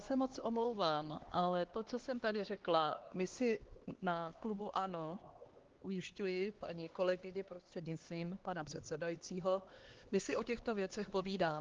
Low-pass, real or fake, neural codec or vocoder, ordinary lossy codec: 7.2 kHz; fake; codec, 16 kHz, 2 kbps, X-Codec, HuBERT features, trained on LibriSpeech; Opus, 16 kbps